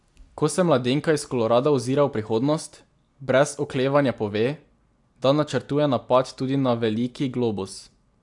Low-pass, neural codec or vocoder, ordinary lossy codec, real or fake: 10.8 kHz; none; AAC, 64 kbps; real